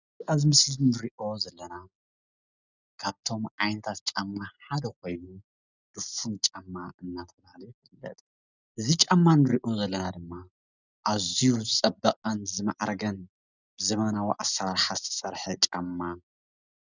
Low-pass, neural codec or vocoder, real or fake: 7.2 kHz; none; real